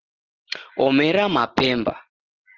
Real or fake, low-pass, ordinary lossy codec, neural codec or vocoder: real; 7.2 kHz; Opus, 16 kbps; none